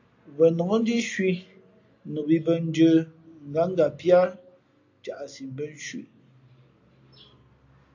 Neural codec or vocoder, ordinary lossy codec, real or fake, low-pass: none; AAC, 48 kbps; real; 7.2 kHz